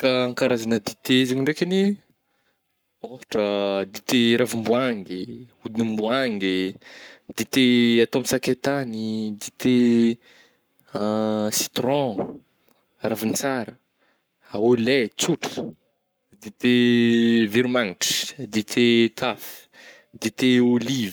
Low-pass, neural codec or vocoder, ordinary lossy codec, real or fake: none; codec, 44.1 kHz, 7.8 kbps, Pupu-Codec; none; fake